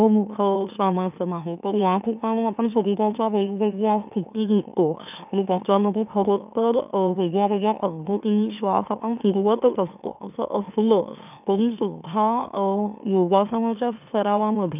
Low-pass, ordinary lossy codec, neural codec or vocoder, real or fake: 3.6 kHz; none; autoencoder, 44.1 kHz, a latent of 192 numbers a frame, MeloTTS; fake